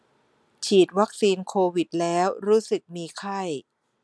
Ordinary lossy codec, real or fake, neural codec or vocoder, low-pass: none; real; none; none